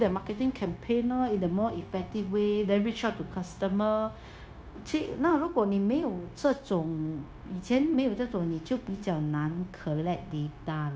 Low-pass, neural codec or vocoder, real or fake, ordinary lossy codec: none; codec, 16 kHz, 0.9 kbps, LongCat-Audio-Codec; fake; none